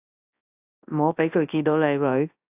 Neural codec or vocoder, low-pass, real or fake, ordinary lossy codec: codec, 24 kHz, 0.9 kbps, WavTokenizer, large speech release; 3.6 kHz; fake; AAC, 32 kbps